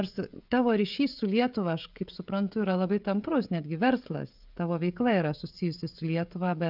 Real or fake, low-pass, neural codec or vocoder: fake; 5.4 kHz; codec, 16 kHz, 16 kbps, FreqCodec, smaller model